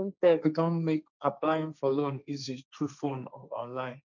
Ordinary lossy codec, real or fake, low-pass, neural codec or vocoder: none; fake; 7.2 kHz; codec, 16 kHz, 1.1 kbps, Voila-Tokenizer